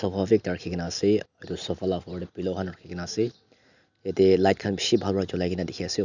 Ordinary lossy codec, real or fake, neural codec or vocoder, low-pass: none; real; none; 7.2 kHz